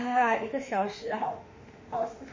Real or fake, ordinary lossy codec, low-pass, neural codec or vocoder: fake; MP3, 32 kbps; 7.2 kHz; autoencoder, 48 kHz, 32 numbers a frame, DAC-VAE, trained on Japanese speech